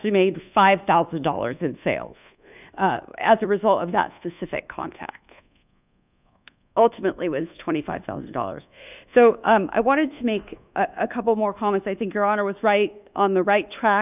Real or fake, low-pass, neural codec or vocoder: fake; 3.6 kHz; codec, 24 kHz, 1.2 kbps, DualCodec